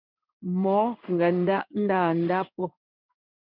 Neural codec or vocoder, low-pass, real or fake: codec, 16 kHz in and 24 kHz out, 1 kbps, XY-Tokenizer; 5.4 kHz; fake